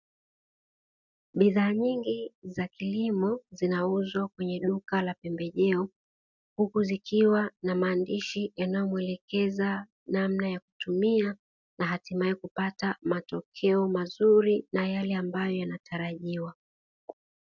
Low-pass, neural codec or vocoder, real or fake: 7.2 kHz; none; real